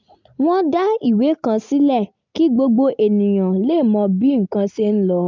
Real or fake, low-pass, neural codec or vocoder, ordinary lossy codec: real; 7.2 kHz; none; MP3, 64 kbps